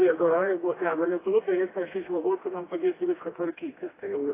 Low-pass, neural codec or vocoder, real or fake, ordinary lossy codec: 3.6 kHz; codec, 16 kHz, 1 kbps, FreqCodec, smaller model; fake; AAC, 16 kbps